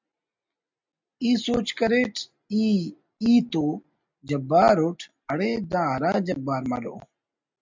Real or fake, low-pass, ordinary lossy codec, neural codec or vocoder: real; 7.2 kHz; MP3, 64 kbps; none